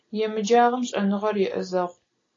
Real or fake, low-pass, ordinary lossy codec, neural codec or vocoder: real; 7.2 kHz; AAC, 32 kbps; none